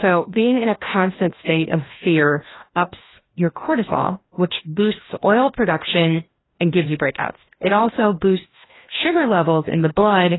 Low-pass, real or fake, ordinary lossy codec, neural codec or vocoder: 7.2 kHz; fake; AAC, 16 kbps; codec, 16 kHz, 1 kbps, FreqCodec, larger model